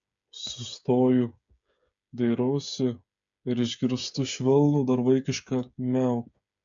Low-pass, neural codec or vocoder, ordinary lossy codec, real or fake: 7.2 kHz; codec, 16 kHz, 8 kbps, FreqCodec, smaller model; MP3, 64 kbps; fake